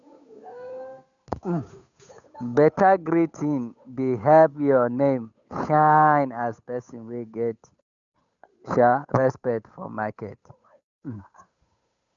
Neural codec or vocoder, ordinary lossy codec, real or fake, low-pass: codec, 16 kHz, 8 kbps, FunCodec, trained on Chinese and English, 25 frames a second; none; fake; 7.2 kHz